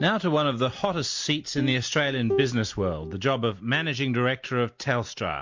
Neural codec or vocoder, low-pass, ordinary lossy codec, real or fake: none; 7.2 kHz; MP3, 48 kbps; real